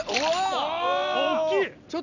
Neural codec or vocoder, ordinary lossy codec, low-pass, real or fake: none; none; 7.2 kHz; real